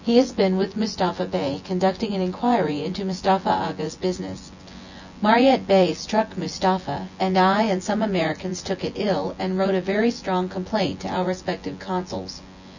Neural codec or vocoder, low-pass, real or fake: vocoder, 24 kHz, 100 mel bands, Vocos; 7.2 kHz; fake